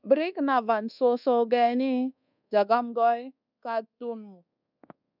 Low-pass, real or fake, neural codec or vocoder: 5.4 kHz; fake; codec, 24 kHz, 1.2 kbps, DualCodec